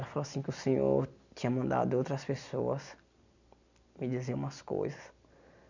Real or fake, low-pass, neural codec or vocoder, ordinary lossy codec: real; 7.2 kHz; none; none